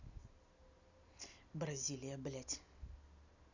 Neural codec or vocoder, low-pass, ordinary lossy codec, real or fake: none; 7.2 kHz; none; real